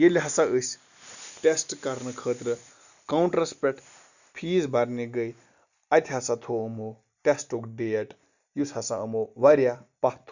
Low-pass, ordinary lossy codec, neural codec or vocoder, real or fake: 7.2 kHz; none; none; real